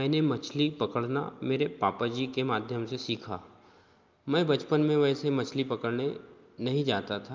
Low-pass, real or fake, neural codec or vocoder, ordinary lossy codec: 7.2 kHz; real; none; Opus, 24 kbps